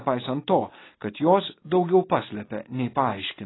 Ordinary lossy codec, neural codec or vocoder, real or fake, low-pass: AAC, 16 kbps; none; real; 7.2 kHz